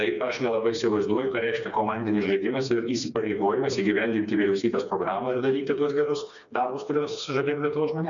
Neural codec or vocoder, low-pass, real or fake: codec, 16 kHz, 2 kbps, FreqCodec, smaller model; 7.2 kHz; fake